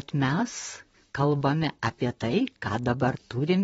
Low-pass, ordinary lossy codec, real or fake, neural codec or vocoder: 19.8 kHz; AAC, 24 kbps; fake; vocoder, 44.1 kHz, 128 mel bands, Pupu-Vocoder